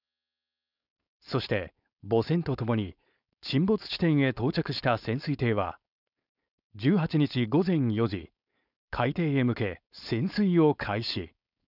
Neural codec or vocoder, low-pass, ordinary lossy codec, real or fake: codec, 16 kHz, 4.8 kbps, FACodec; 5.4 kHz; none; fake